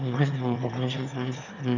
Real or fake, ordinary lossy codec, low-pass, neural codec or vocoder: fake; none; 7.2 kHz; autoencoder, 22.05 kHz, a latent of 192 numbers a frame, VITS, trained on one speaker